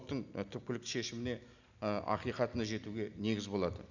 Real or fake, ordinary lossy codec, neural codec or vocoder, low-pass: real; none; none; 7.2 kHz